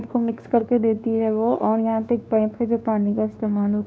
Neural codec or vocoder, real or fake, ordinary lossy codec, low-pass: codec, 16 kHz, 2 kbps, X-Codec, WavLM features, trained on Multilingual LibriSpeech; fake; none; none